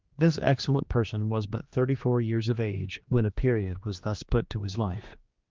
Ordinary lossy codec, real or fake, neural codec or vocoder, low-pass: Opus, 24 kbps; fake; codec, 16 kHz, 2 kbps, X-Codec, HuBERT features, trained on general audio; 7.2 kHz